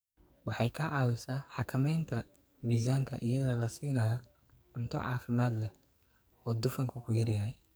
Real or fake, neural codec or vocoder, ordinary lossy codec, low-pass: fake; codec, 44.1 kHz, 2.6 kbps, SNAC; none; none